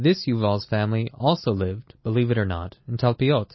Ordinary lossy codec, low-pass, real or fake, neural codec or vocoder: MP3, 24 kbps; 7.2 kHz; real; none